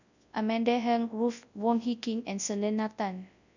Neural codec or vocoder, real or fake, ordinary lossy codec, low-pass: codec, 24 kHz, 0.9 kbps, WavTokenizer, large speech release; fake; none; 7.2 kHz